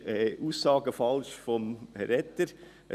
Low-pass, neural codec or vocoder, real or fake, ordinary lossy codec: 14.4 kHz; none; real; none